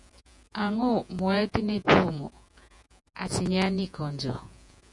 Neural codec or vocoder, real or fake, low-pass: vocoder, 48 kHz, 128 mel bands, Vocos; fake; 10.8 kHz